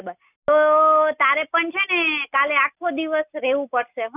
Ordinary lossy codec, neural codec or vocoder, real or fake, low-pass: none; none; real; 3.6 kHz